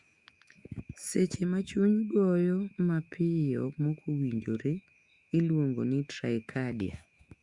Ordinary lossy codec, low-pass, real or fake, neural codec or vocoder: Opus, 64 kbps; 10.8 kHz; fake; autoencoder, 48 kHz, 128 numbers a frame, DAC-VAE, trained on Japanese speech